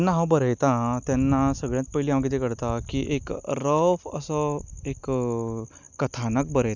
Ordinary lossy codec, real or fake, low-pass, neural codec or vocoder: none; real; 7.2 kHz; none